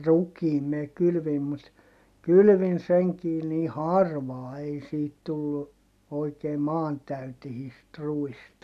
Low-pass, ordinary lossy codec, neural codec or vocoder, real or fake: 14.4 kHz; none; none; real